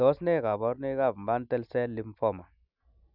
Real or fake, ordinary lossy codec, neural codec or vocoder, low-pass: real; none; none; 5.4 kHz